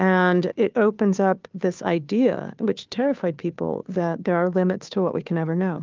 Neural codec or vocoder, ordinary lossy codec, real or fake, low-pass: codec, 16 kHz, 2 kbps, FunCodec, trained on Chinese and English, 25 frames a second; Opus, 24 kbps; fake; 7.2 kHz